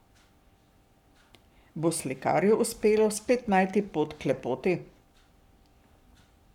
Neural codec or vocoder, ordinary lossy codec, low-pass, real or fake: codec, 44.1 kHz, 7.8 kbps, Pupu-Codec; none; 19.8 kHz; fake